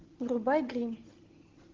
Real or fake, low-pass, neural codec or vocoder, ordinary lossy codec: real; 7.2 kHz; none; Opus, 16 kbps